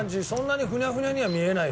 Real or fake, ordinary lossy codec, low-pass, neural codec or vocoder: real; none; none; none